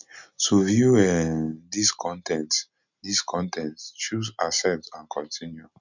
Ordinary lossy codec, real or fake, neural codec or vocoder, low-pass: none; real; none; 7.2 kHz